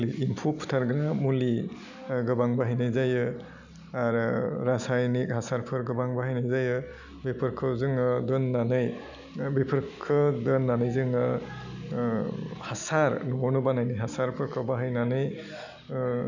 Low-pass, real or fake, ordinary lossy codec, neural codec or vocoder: 7.2 kHz; real; none; none